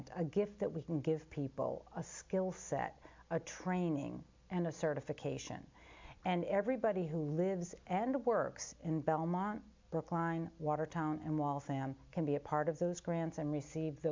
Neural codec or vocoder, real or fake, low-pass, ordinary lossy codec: none; real; 7.2 kHz; MP3, 64 kbps